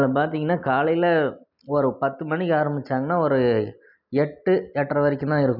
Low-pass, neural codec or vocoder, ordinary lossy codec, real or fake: 5.4 kHz; none; none; real